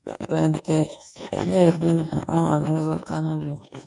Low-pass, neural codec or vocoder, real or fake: 10.8 kHz; codec, 24 kHz, 1.2 kbps, DualCodec; fake